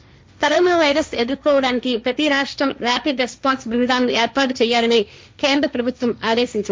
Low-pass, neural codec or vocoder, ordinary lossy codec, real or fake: none; codec, 16 kHz, 1.1 kbps, Voila-Tokenizer; none; fake